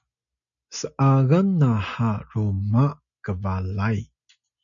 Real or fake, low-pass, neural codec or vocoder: real; 7.2 kHz; none